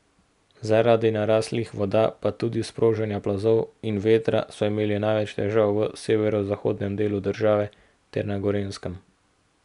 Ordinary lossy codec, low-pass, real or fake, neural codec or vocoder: none; 10.8 kHz; real; none